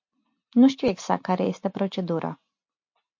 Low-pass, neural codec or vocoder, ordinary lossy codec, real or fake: 7.2 kHz; none; MP3, 48 kbps; real